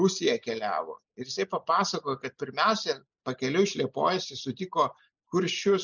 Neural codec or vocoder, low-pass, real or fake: none; 7.2 kHz; real